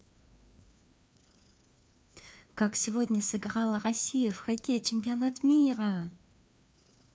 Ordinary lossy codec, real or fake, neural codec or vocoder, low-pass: none; fake; codec, 16 kHz, 2 kbps, FreqCodec, larger model; none